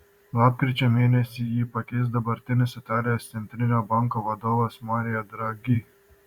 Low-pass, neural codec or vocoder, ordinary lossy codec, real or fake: 19.8 kHz; vocoder, 44.1 kHz, 128 mel bands every 512 samples, BigVGAN v2; Opus, 64 kbps; fake